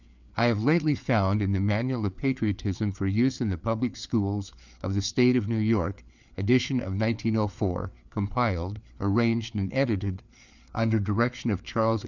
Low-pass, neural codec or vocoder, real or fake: 7.2 kHz; codec, 16 kHz, 4 kbps, FreqCodec, larger model; fake